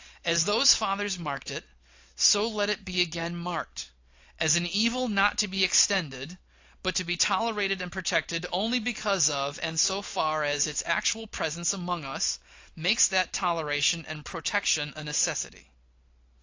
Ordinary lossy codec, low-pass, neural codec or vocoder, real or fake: AAC, 48 kbps; 7.2 kHz; none; real